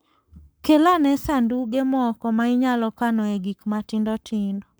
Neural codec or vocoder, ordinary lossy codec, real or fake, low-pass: codec, 44.1 kHz, 7.8 kbps, Pupu-Codec; none; fake; none